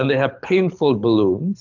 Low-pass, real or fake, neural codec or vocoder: 7.2 kHz; fake; codec, 24 kHz, 6 kbps, HILCodec